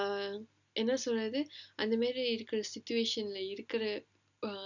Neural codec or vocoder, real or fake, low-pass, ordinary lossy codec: none; real; 7.2 kHz; none